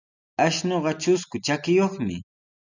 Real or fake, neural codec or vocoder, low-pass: real; none; 7.2 kHz